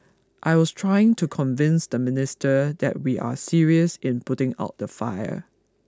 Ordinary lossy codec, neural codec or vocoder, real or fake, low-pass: none; none; real; none